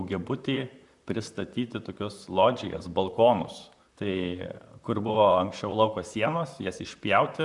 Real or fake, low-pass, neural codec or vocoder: fake; 10.8 kHz; vocoder, 44.1 kHz, 128 mel bands, Pupu-Vocoder